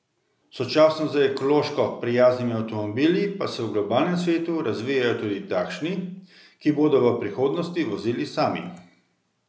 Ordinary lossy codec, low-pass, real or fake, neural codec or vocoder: none; none; real; none